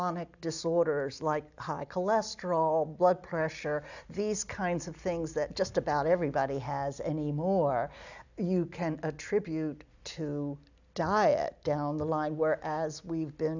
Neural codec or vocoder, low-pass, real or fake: none; 7.2 kHz; real